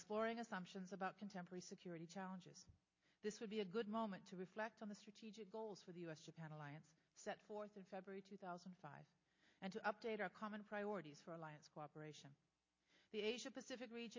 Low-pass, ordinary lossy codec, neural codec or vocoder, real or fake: 7.2 kHz; MP3, 32 kbps; none; real